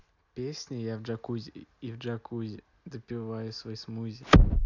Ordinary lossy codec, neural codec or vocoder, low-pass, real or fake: none; none; 7.2 kHz; real